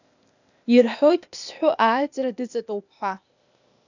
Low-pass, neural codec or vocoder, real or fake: 7.2 kHz; codec, 16 kHz, 0.8 kbps, ZipCodec; fake